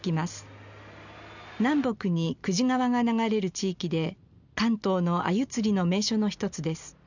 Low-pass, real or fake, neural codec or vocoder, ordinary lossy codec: 7.2 kHz; real; none; none